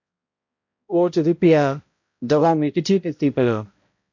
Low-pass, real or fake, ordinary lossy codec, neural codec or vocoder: 7.2 kHz; fake; MP3, 48 kbps; codec, 16 kHz, 0.5 kbps, X-Codec, HuBERT features, trained on balanced general audio